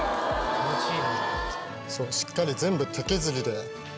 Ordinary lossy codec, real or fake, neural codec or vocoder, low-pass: none; real; none; none